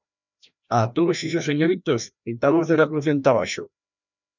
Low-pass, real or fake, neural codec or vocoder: 7.2 kHz; fake; codec, 16 kHz, 1 kbps, FreqCodec, larger model